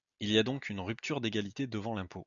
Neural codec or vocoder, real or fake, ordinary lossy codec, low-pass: none; real; MP3, 96 kbps; 7.2 kHz